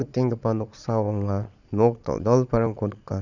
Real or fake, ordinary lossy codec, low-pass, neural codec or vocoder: fake; none; 7.2 kHz; vocoder, 22.05 kHz, 80 mel bands, Vocos